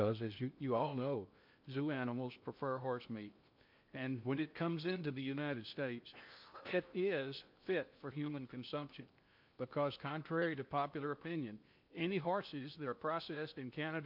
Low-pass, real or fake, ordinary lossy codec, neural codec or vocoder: 5.4 kHz; fake; MP3, 48 kbps; codec, 16 kHz in and 24 kHz out, 0.8 kbps, FocalCodec, streaming, 65536 codes